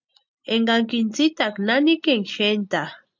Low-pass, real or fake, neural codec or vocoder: 7.2 kHz; real; none